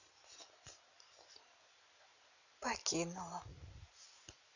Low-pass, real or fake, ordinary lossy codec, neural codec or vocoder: 7.2 kHz; real; none; none